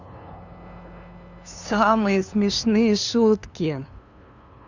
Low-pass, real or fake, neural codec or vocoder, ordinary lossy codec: 7.2 kHz; fake; codec, 16 kHz, 2 kbps, FunCodec, trained on LibriTTS, 25 frames a second; none